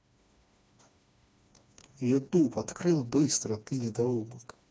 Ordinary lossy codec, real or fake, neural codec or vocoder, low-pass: none; fake; codec, 16 kHz, 2 kbps, FreqCodec, smaller model; none